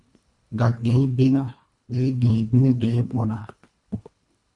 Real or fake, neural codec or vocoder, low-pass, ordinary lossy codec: fake; codec, 24 kHz, 1.5 kbps, HILCodec; 10.8 kHz; Opus, 64 kbps